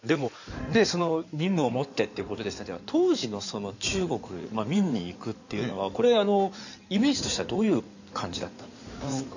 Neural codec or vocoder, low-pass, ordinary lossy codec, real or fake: codec, 16 kHz in and 24 kHz out, 2.2 kbps, FireRedTTS-2 codec; 7.2 kHz; none; fake